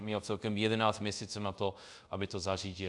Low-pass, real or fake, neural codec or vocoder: 10.8 kHz; fake; codec, 24 kHz, 0.5 kbps, DualCodec